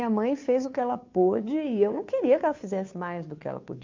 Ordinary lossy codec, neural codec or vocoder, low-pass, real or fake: MP3, 48 kbps; codec, 16 kHz, 2 kbps, FunCodec, trained on Chinese and English, 25 frames a second; 7.2 kHz; fake